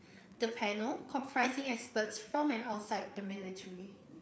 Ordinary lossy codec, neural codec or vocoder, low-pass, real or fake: none; codec, 16 kHz, 4 kbps, FreqCodec, larger model; none; fake